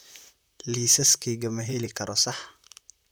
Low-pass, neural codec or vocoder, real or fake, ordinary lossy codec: none; vocoder, 44.1 kHz, 128 mel bands, Pupu-Vocoder; fake; none